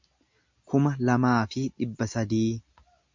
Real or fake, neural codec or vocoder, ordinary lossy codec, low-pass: real; none; MP3, 64 kbps; 7.2 kHz